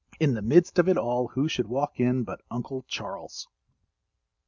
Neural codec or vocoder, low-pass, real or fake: none; 7.2 kHz; real